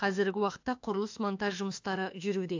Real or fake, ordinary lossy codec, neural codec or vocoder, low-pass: fake; AAC, 48 kbps; autoencoder, 48 kHz, 32 numbers a frame, DAC-VAE, trained on Japanese speech; 7.2 kHz